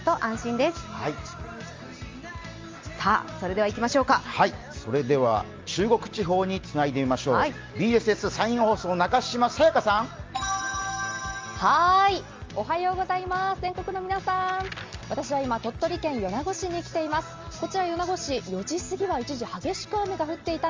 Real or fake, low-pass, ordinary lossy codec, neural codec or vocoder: real; 7.2 kHz; Opus, 32 kbps; none